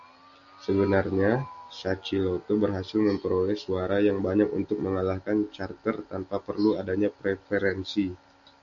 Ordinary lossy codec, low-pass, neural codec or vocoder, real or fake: AAC, 64 kbps; 7.2 kHz; none; real